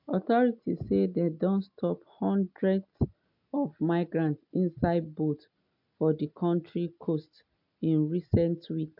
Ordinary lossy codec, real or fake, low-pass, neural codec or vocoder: MP3, 48 kbps; real; 5.4 kHz; none